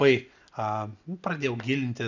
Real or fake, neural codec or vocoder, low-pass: real; none; 7.2 kHz